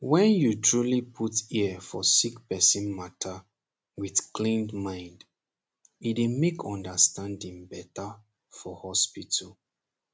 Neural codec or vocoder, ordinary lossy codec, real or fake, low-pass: none; none; real; none